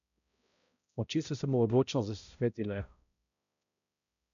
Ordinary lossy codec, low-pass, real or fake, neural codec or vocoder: none; 7.2 kHz; fake; codec, 16 kHz, 0.5 kbps, X-Codec, HuBERT features, trained on balanced general audio